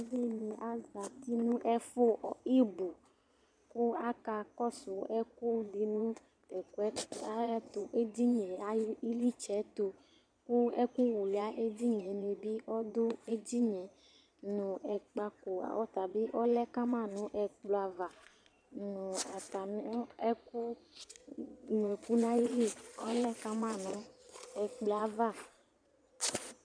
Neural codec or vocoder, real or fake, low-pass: vocoder, 22.05 kHz, 80 mel bands, Vocos; fake; 9.9 kHz